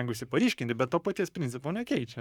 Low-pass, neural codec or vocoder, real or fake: 19.8 kHz; codec, 44.1 kHz, 7.8 kbps, Pupu-Codec; fake